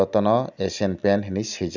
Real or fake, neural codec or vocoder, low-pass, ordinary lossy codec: real; none; 7.2 kHz; none